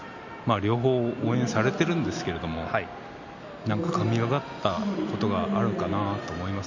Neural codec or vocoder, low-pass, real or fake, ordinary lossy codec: none; 7.2 kHz; real; MP3, 64 kbps